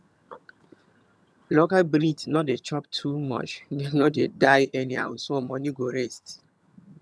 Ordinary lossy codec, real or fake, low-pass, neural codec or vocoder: none; fake; none; vocoder, 22.05 kHz, 80 mel bands, HiFi-GAN